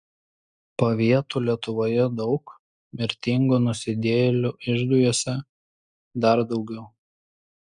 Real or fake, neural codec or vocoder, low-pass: real; none; 9.9 kHz